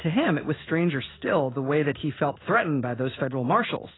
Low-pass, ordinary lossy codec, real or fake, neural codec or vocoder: 7.2 kHz; AAC, 16 kbps; fake; codec, 16 kHz in and 24 kHz out, 1 kbps, XY-Tokenizer